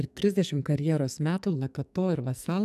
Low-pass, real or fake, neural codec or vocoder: 14.4 kHz; fake; codec, 44.1 kHz, 2.6 kbps, SNAC